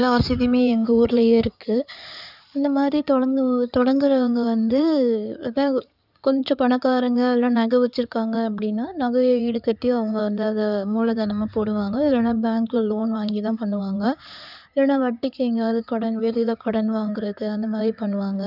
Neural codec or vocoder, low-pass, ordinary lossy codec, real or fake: codec, 16 kHz in and 24 kHz out, 2.2 kbps, FireRedTTS-2 codec; 5.4 kHz; none; fake